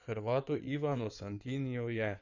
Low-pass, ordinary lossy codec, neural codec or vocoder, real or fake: 7.2 kHz; none; codec, 16 kHz in and 24 kHz out, 2.2 kbps, FireRedTTS-2 codec; fake